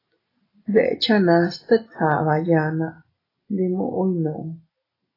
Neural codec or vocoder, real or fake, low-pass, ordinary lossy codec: none; real; 5.4 kHz; AAC, 24 kbps